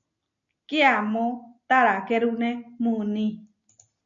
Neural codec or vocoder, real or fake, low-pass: none; real; 7.2 kHz